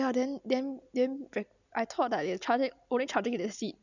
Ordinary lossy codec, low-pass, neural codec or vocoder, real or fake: none; 7.2 kHz; none; real